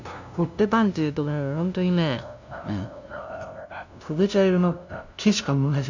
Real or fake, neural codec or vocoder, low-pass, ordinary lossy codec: fake; codec, 16 kHz, 0.5 kbps, FunCodec, trained on LibriTTS, 25 frames a second; 7.2 kHz; none